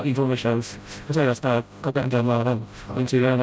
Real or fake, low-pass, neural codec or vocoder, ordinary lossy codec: fake; none; codec, 16 kHz, 0.5 kbps, FreqCodec, smaller model; none